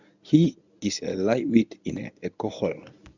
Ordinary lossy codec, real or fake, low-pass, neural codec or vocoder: none; fake; 7.2 kHz; codec, 24 kHz, 0.9 kbps, WavTokenizer, medium speech release version 1